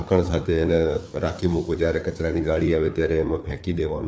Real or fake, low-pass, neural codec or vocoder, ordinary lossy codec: fake; none; codec, 16 kHz, 4 kbps, FreqCodec, larger model; none